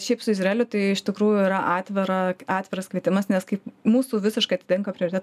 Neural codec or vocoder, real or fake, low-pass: none; real; 14.4 kHz